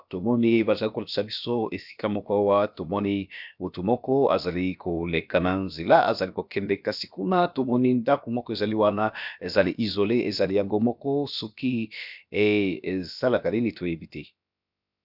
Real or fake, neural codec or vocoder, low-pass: fake; codec, 16 kHz, about 1 kbps, DyCAST, with the encoder's durations; 5.4 kHz